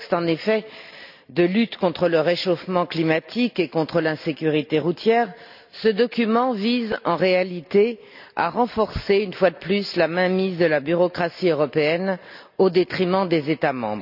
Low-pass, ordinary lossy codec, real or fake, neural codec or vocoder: 5.4 kHz; none; real; none